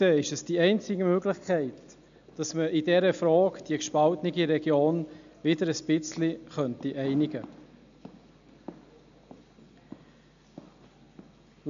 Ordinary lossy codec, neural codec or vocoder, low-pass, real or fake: AAC, 96 kbps; none; 7.2 kHz; real